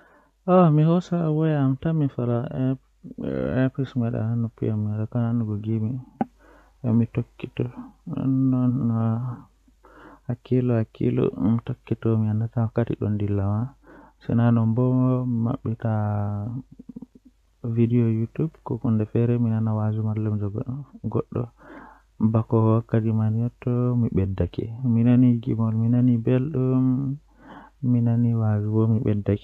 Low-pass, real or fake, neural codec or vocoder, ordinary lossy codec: 14.4 kHz; real; none; none